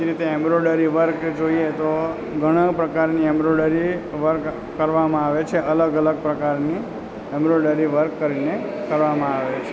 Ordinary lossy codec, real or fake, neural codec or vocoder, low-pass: none; real; none; none